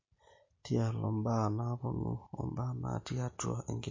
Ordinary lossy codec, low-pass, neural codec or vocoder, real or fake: MP3, 32 kbps; 7.2 kHz; none; real